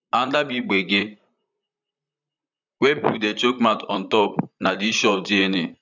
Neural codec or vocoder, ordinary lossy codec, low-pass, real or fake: vocoder, 44.1 kHz, 128 mel bands, Pupu-Vocoder; none; 7.2 kHz; fake